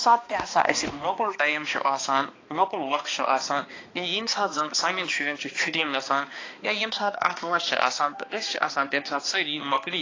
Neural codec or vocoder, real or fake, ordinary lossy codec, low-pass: codec, 16 kHz, 2 kbps, X-Codec, HuBERT features, trained on balanced general audio; fake; AAC, 32 kbps; 7.2 kHz